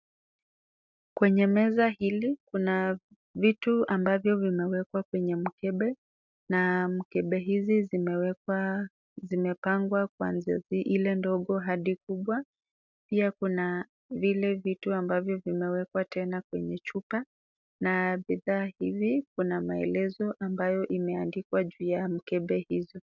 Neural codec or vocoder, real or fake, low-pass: none; real; 7.2 kHz